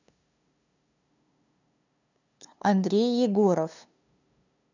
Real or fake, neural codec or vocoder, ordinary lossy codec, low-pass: fake; codec, 16 kHz, 2 kbps, FunCodec, trained on LibriTTS, 25 frames a second; none; 7.2 kHz